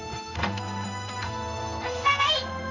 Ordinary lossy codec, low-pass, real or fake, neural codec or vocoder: none; 7.2 kHz; fake; codec, 16 kHz in and 24 kHz out, 1 kbps, XY-Tokenizer